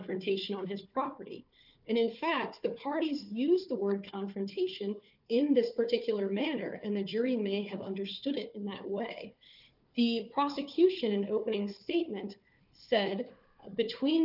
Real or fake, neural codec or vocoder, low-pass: fake; codec, 16 kHz, 4 kbps, FunCodec, trained on Chinese and English, 50 frames a second; 5.4 kHz